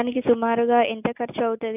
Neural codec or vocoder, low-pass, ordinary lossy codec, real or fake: none; 3.6 kHz; none; real